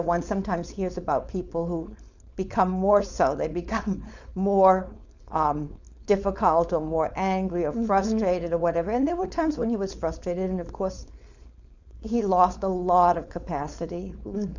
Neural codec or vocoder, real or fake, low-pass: codec, 16 kHz, 4.8 kbps, FACodec; fake; 7.2 kHz